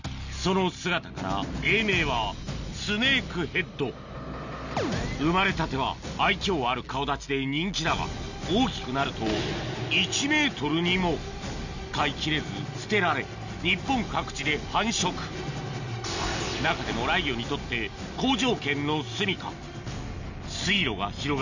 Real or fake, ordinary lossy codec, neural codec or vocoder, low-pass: real; none; none; 7.2 kHz